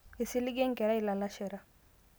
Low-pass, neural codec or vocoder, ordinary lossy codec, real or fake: none; none; none; real